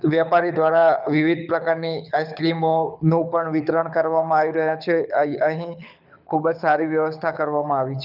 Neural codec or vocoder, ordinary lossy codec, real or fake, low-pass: codec, 24 kHz, 6 kbps, HILCodec; none; fake; 5.4 kHz